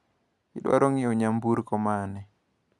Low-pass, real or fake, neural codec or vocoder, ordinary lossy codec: none; real; none; none